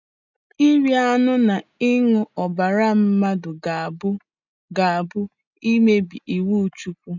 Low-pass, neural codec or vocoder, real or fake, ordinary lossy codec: 7.2 kHz; none; real; none